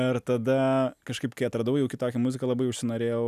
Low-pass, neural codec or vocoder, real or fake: 14.4 kHz; none; real